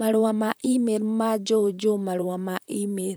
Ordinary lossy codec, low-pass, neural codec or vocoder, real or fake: none; none; vocoder, 44.1 kHz, 128 mel bands, Pupu-Vocoder; fake